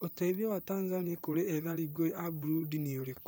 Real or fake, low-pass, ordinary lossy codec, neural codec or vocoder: fake; none; none; vocoder, 44.1 kHz, 128 mel bands, Pupu-Vocoder